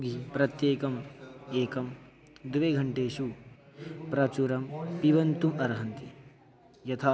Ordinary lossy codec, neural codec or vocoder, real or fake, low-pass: none; none; real; none